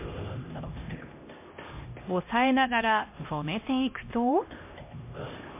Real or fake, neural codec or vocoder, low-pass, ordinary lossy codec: fake; codec, 16 kHz, 1 kbps, X-Codec, HuBERT features, trained on LibriSpeech; 3.6 kHz; MP3, 24 kbps